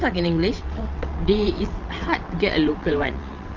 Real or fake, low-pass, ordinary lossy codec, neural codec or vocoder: fake; 7.2 kHz; Opus, 24 kbps; vocoder, 22.05 kHz, 80 mel bands, WaveNeXt